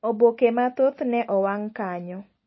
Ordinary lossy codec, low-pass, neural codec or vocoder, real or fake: MP3, 24 kbps; 7.2 kHz; none; real